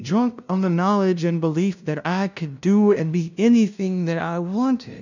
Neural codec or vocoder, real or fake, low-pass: codec, 16 kHz, 0.5 kbps, FunCodec, trained on LibriTTS, 25 frames a second; fake; 7.2 kHz